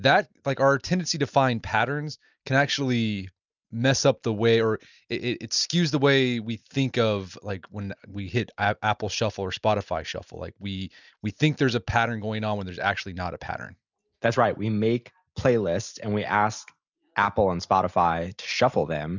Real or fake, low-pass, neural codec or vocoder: real; 7.2 kHz; none